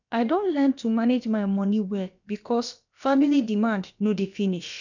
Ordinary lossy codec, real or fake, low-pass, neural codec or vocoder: none; fake; 7.2 kHz; codec, 16 kHz, about 1 kbps, DyCAST, with the encoder's durations